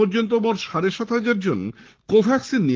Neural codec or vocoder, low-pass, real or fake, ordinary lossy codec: codec, 44.1 kHz, 7.8 kbps, Pupu-Codec; 7.2 kHz; fake; Opus, 16 kbps